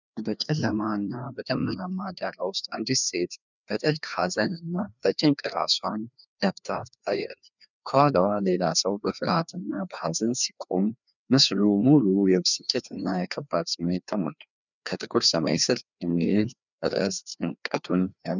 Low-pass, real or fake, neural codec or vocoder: 7.2 kHz; fake; codec, 16 kHz, 2 kbps, FreqCodec, larger model